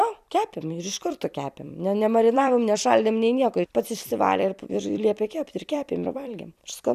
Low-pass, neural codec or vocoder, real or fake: 14.4 kHz; vocoder, 44.1 kHz, 128 mel bands every 256 samples, BigVGAN v2; fake